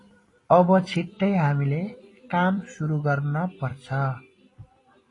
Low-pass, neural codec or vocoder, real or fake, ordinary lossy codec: 10.8 kHz; vocoder, 44.1 kHz, 128 mel bands every 256 samples, BigVGAN v2; fake; AAC, 32 kbps